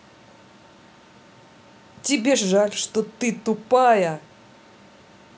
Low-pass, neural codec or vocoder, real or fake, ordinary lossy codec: none; none; real; none